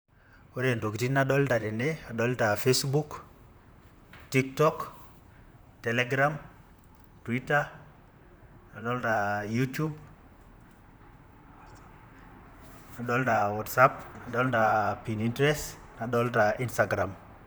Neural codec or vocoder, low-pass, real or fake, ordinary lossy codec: vocoder, 44.1 kHz, 128 mel bands, Pupu-Vocoder; none; fake; none